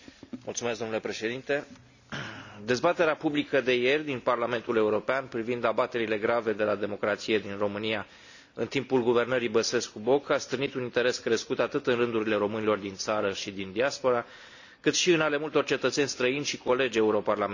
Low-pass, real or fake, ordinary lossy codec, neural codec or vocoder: 7.2 kHz; real; none; none